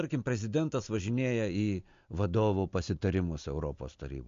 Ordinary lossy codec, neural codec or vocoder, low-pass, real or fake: MP3, 48 kbps; none; 7.2 kHz; real